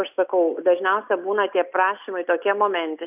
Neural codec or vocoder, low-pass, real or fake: none; 3.6 kHz; real